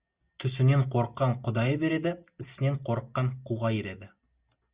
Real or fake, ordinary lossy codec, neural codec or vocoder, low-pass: real; Opus, 64 kbps; none; 3.6 kHz